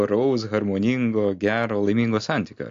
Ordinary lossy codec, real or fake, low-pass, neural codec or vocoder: AAC, 64 kbps; real; 7.2 kHz; none